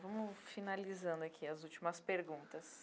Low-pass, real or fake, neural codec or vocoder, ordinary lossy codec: none; real; none; none